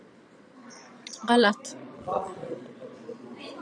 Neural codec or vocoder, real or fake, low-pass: vocoder, 22.05 kHz, 80 mel bands, Vocos; fake; 9.9 kHz